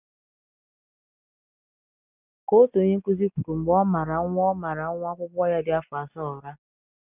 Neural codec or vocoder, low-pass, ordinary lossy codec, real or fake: none; 3.6 kHz; none; real